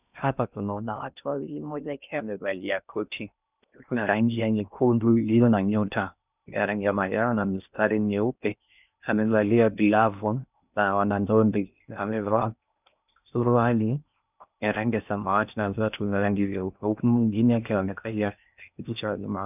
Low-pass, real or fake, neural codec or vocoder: 3.6 kHz; fake; codec, 16 kHz in and 24 kHz out, 0.6 kbps, FocalCodec, streaming, 4096 codes